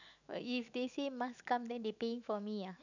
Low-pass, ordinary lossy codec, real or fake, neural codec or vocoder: 7.2 kHz; none; fake; autoencoder, 48 kHz, 128 numbers a frame, DAC-VAE, trained on Japanese speech